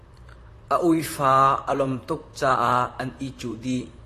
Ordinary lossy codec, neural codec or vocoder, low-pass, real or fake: AAC, 48 kbps; vocoder, 44.1 kHz, 128 mel bands, Pupu-Vocoder; 14.4 kHz; fake